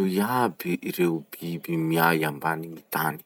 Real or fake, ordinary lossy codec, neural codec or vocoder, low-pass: real; none; none; none